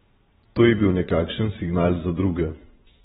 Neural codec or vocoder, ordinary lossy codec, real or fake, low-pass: vocoder, 22.05 kHz, 80 mel bands, Vocos; AAC, 16 kbps; fake; 9.9 kHz